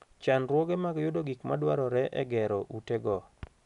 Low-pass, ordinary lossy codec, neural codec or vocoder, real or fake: 10.8 kHz; none; none; real